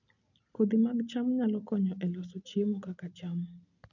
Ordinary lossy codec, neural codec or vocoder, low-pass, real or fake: none; none; 7.2 kHz; real